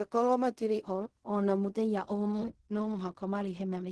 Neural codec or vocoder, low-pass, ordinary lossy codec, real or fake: codec, 16 kHz in and 24 kHz out, 0.4 kbps, LongCat-Audio-Codec, fine tuned four codebook decoder; 10.8 kHz; Opus, 16 kbps; fake